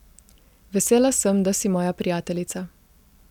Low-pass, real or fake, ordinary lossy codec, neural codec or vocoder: 19.8 kHz; real; none; none